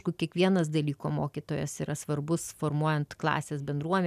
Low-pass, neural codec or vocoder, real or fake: 14.4 kHz; none; real